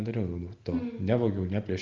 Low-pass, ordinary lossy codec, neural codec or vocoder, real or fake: 7.2 kHz; Opus, 24 kbps; none; real